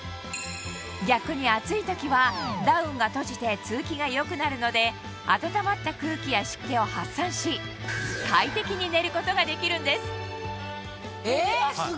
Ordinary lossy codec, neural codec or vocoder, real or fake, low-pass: none; none; real; none